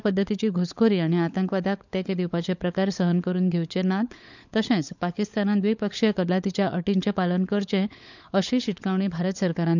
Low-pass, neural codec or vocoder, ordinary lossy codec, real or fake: 7.2 kHz; codec, 16 kHz, 16 kbps, FunCodec, trained on LibriTTS, 50 frames a second; none; fake